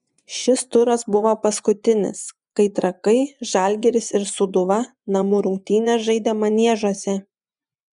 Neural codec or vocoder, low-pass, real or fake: vocoder, 24 kHz, 100 mel bands, Vocos; 10.8 kHz; fake